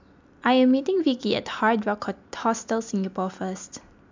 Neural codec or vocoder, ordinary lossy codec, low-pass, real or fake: none; MP3, 64 kbps; 7.2 kHz; real